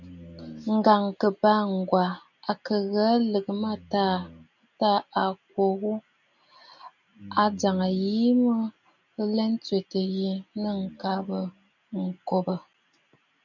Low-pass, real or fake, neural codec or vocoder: 7.2 kHz; real; none